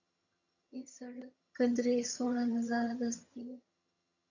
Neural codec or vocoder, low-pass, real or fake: vocoder, 22.05 kHz, 80 mel bands, HiFi-GAN; 7.2 kHz; fake